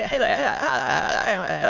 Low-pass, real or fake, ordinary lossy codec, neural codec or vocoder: 7.2 kHz; fake; none; autoencoder, 22.05 kHz, a latent of 192 numbers a frame, VITS, trained on many speakers